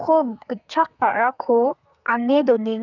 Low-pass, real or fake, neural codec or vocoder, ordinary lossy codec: 7.2 kHz; fake; codec, 32 kHz, 1.9 kbps, SNAC; none